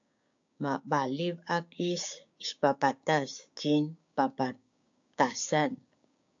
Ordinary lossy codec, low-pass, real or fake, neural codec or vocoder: AAC, 64 kbps; 7.2 kHz; fake; codec, 16 kHz, 6 kbps, DAC